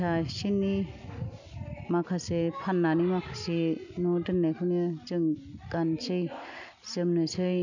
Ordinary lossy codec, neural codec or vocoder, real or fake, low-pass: none; none; real; 7.2 kHz